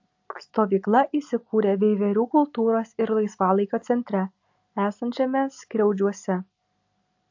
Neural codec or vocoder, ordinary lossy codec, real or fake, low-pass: none; AAC, 48 kbps; real; 7.2 kHz